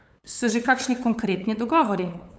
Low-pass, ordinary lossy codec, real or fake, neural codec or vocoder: none; none; fake; codec, 16 kHz, 8 kbps, FunCodec, trained on LibriTTS, 25 frames a second